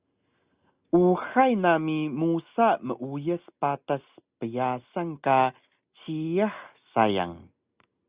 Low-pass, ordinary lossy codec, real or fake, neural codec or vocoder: 3.6 kHz; Opus, 64 kbps; real; none